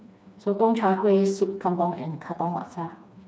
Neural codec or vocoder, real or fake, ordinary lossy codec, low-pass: codec, 16 kHz, 2 kbps, FreqCodec, smaller model; fake; none; none